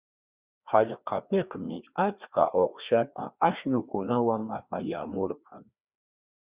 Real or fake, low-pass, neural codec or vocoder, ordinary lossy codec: fake; 3.6 kHz; codec, 16 kHz, 2 kbps, FreqCodec, larger model; Opus, 64 kbps